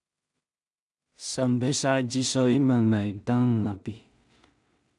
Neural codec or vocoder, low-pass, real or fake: codec, 16 kHz in and 24 kHz out, 0.4 kbps, LongCat-Audio-Codec, two codebook decoder; 10.8 kHz; fake